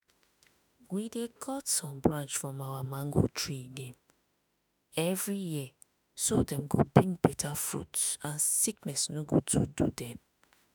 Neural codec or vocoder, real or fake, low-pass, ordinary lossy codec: autoencoder, 48 kHz, 32 numbers a frame, DAC-VAE, trained on Japanese speech; fake; none; none